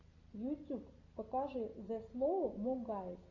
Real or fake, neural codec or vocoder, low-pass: real; none; 7.2 kHz